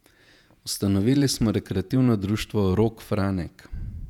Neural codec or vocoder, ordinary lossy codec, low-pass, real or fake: vocoder, 48 kHz, 128 mel bands, Vocos; none; 19.8 kHz; fake